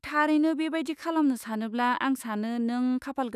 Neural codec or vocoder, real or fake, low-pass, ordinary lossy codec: autoencoder, 48 kHz, 128 numbers a frame, DAC-VAE, trained on Japanese speech; fake; 14.4 kHz; none